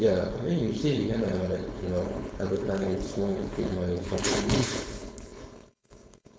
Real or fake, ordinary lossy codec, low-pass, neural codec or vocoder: fake; none; none; codec, 16 kHz, 4.8 kbps, FACodec